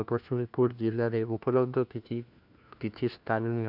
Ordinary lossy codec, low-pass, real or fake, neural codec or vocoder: none; 5.4 kHz; fake; codec, 16 kHz, 1 kbps, FunCodec, trained on LibriTTS, 50 frames a second